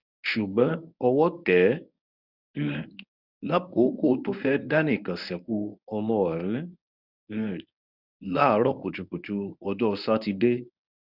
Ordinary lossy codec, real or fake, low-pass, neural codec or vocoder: none; fake; 5.4 kHz; codec, 24 kHz, 0.9 kbps, WavTokenizer, medium speech release version 1